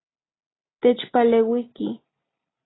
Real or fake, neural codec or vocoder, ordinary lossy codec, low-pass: real; none; AAC, 16 kbps; 7.2 kHz